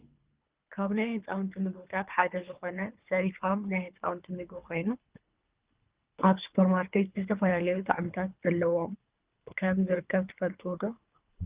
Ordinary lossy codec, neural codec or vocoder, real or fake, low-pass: Opus, 32 kbps; codec, 24 kHz, 3 kbps, HILCodec; fake; 3.6 kHz